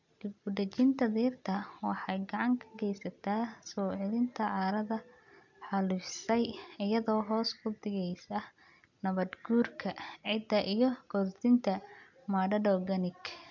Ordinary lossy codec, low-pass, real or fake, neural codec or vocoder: none; 7.2 kHz; real; none